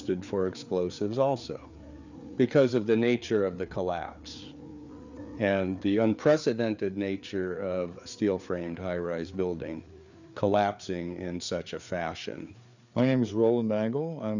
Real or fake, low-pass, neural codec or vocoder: fake; 7.2 kHz; codec, 16 kHz, 4 kbps, FreqCodec, larger model